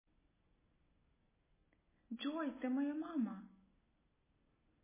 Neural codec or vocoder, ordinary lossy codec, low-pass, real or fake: none; MP3, 16 kbps; 3.6 kHz; real